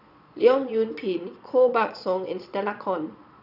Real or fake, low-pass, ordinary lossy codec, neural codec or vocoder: fake; 5.4 kHz; none; vocoder, 22.05 kHz, 80 mel bands, Vocos